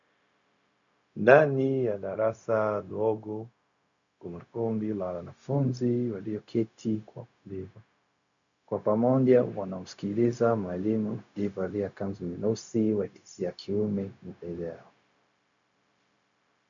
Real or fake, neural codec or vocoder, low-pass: fake; codec, 16 kHz, 0.4 kbps, LongCat-Audio-Codec; 7.2 kHz